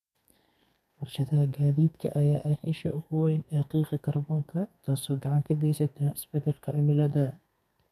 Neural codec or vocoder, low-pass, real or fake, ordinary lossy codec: codec, 32 kHz, 1.9 kbps, SNAC; 14.4 kHz; fake; none